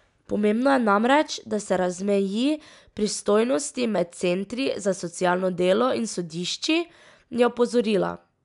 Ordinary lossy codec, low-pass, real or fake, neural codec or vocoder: none; 10.8 kHz; real; none